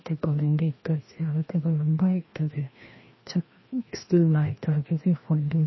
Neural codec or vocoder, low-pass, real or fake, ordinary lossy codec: codec, 16 kHz, 1 kbps, FreqCodec, larger model; 7.2 kHz; fake; MP3, 24 kbps